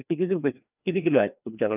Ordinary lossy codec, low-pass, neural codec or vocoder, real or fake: none; 3.6 kHz; codec, 16 kHz, 2 kbps, FreqCodec, larger model; fake